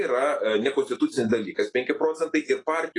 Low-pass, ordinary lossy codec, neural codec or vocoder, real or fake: 10.8 kHz; AAC, 32 kbps; none; real